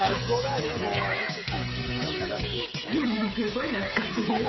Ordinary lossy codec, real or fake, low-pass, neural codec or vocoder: MP3, 24 kbps; fake; 7.2 kHz; codec, 16 kHz, 8 kbps, FreqCodec, smaller model